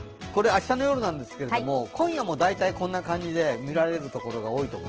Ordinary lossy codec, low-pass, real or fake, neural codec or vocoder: Opus, 16 kbps; 7.2 kHz; real; none